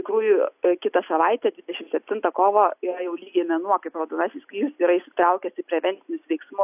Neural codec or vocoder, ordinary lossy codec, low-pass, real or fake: none; AAC, 32 kbps; 3.6 kHz; real